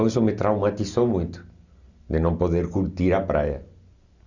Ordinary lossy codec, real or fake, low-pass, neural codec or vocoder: Opus, 64 kbps; real; 7.2 kHz; none